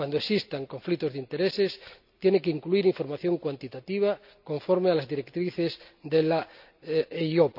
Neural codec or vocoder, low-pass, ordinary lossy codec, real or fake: none; 5.4 kHz; none; real